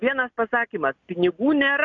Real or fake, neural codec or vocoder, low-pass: real; none; 7.2 kHz